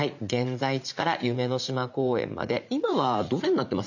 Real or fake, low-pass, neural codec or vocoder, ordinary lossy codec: fake; 7.2 kHz; vocoder, 44.1 kHz, 80 mel bands, Vocos; none